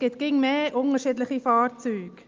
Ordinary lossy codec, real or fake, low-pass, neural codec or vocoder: Opus, 24 kbps; real; 7.2 kHz; none